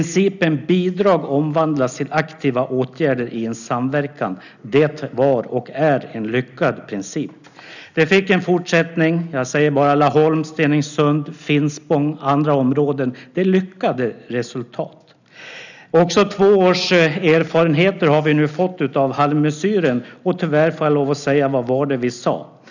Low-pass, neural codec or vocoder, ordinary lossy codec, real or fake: 7.2 kHz; none; none; real